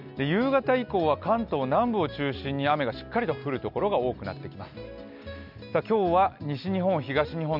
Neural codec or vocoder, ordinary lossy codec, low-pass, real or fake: none; none; 5.4 kHz; real